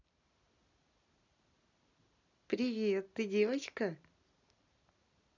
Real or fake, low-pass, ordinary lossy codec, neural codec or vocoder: real; 7.2 kHz; none; none